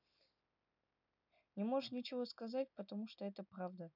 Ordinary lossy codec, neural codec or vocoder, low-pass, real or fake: none; none; 5.4 kHz; real